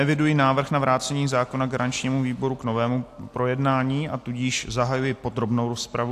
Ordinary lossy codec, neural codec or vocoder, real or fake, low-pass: AAC, 64 kbps; none; real; 14.4 kHz